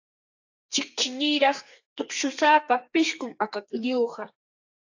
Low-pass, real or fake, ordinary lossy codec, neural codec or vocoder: 7.2 kHz; fake; AAC, 48 kbps; codec, 32 kHz, 1.9 kbps, SNAC